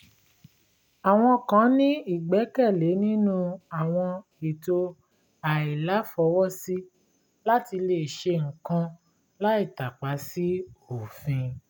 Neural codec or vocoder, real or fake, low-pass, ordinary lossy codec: none; real; none; none